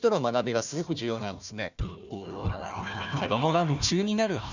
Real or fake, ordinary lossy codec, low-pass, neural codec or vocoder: fake; none; 7.2 kHz; codec, 16 kHz, 1 kbps, FunCodec, trained on Chinese and English, 50 frames a second